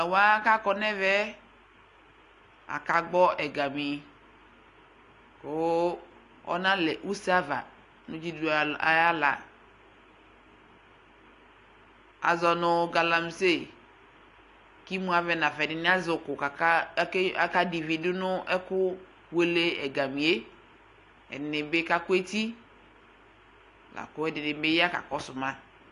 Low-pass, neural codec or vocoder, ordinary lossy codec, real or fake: 10.8 kHz; none; AAC, 48 kbps; real